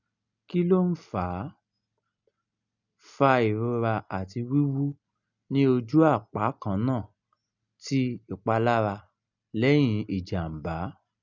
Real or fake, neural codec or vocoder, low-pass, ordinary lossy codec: real; none; 7.2 kHz; none